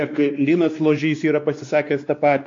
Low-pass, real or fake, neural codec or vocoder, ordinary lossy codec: 7.2 kHz; fake; codec, 16 kHz, 2 kbps, X-Codec, WavLM features, trained on Multilingual LibriSpeech; AAC, 48 kbps